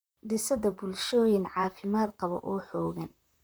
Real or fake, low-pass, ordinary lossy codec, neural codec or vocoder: fake; none; none; vocoder, 44.1 kHz, 128 mel bands, Pupu-Vocoder